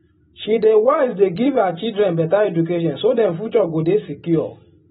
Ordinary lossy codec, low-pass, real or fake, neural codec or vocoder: AAC, 16 kbps; 7.2 kHz; real; none